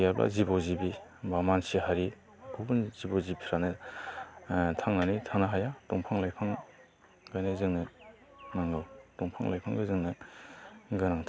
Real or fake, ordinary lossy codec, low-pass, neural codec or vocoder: real; none; none; none